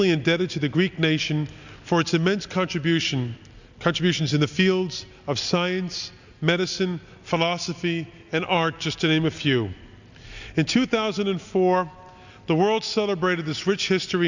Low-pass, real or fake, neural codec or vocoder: 7.2 kHz; real; none